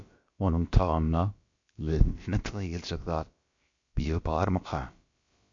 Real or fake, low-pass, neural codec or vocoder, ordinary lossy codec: fake; 7.2 kHz; codec, 16 kHz, about 1 kbps, DyCAST, with the encoder's durations; MP3, 48 kbps